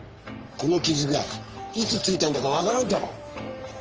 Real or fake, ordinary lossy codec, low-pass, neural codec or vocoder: fake; Opus, 24 kbps; 7.2 kHz; codec, 44.1 kHz, 3.4 kbps, Pupu-Codec